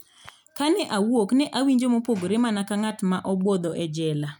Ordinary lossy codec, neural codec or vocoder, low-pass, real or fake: none; none; 19.8 kHz; real